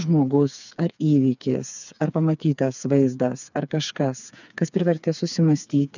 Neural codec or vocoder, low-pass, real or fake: codec, 16 kHz, 4 kbps, FreqCodec, smaller model; 7.2 kHz; fake